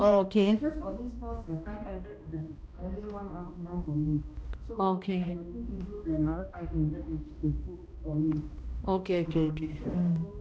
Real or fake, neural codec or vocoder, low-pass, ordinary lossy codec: fake; codec, 16 kHz, 1 kbps, X-Codec, HuBERT features, trained on balanced general audio; none; none